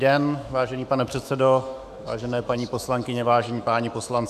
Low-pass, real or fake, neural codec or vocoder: 14.4 kHz; fake; autoencoder, 48 kHz, 128 numbers a frame, DAC-VAE, trained on Japanese speech